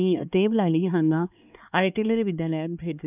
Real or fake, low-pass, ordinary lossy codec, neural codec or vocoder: fake; 3.6 kHz; none; codec, 16 kHz, 2 kbps, X-Codec, HuBERT features, trained on LibriSpeech